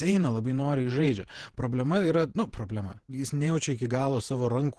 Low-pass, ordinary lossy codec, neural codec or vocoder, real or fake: 10.8 kHz; Opus, 16 kbps; vocoder, 48 kHz, 128 mel bands, Vocos; fake